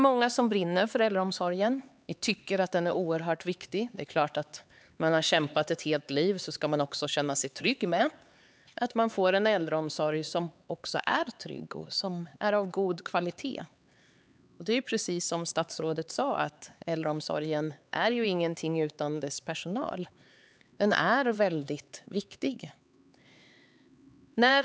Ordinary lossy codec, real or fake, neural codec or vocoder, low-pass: none; fake; codec, 16 kHz, 4 kbps, X-Codec, HuBERT features, trained on LibriSpeech; none